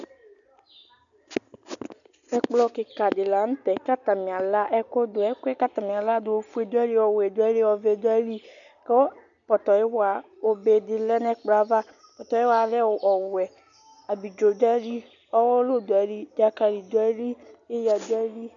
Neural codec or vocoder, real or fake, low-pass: none; real; 7.2 kHz